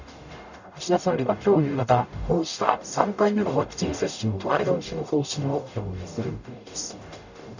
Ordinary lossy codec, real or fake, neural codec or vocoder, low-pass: none; fake; codec, 44.1 kHz, 0.9 kbps, DAC; 7.2 kHz